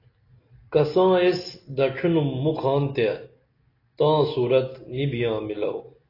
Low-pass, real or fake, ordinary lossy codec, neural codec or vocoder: 5.4 kHz; real; AAC, 24 kbps; none